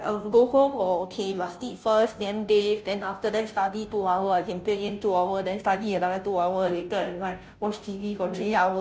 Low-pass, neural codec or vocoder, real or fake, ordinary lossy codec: none; codec, 16 kHz, 0.5 kbps, FunCodec, trained on Chinese and English, 25 frames a second; fake; none